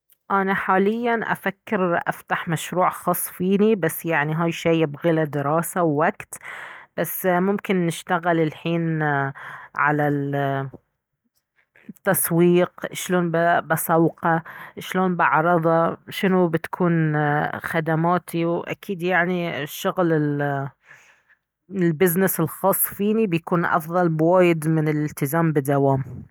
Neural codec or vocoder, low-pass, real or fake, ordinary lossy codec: none; none; real; none